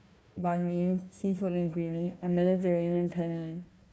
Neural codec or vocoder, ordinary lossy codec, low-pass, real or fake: codec, 16 kHz, 1 kbps, FunCodec, trained on Chinese and English, 50 frames a second; none; none; fake